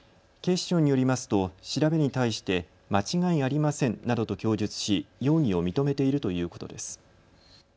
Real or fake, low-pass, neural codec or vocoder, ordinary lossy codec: real; none; none; none